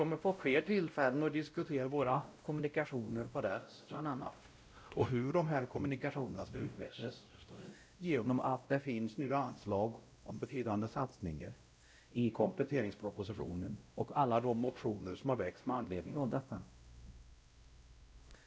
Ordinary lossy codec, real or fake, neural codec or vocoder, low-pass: none; fake; codec, 16 kHz, 0.5 kbps, X-Codec, WavLM features, trained on Multilingual LibriSpeech; none